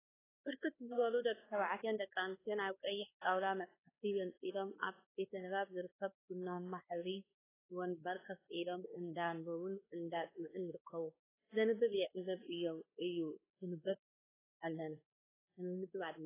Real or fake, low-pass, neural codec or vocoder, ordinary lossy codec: fake; 3.6 kHz; codec, 16 kHz, 2 kbps, X-Codec, WavLM features, trained on Multilingual LibriSpeech; AAC, 16 kbps